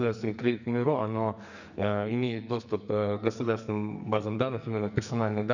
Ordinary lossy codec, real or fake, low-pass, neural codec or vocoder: none; fake; 7.2 kHz; codec, 44.1 kHz, 2.6 kbps, SNAC